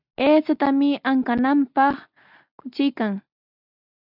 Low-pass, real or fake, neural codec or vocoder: 5.4 kHz; real; none